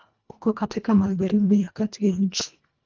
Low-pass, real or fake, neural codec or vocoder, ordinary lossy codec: 7.2 kHz; fake; codec, 24 kHz, 1.5 kbps, HILCodec; Opus, 24 kbps